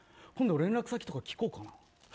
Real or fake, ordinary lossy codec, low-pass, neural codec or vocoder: real; none; none; none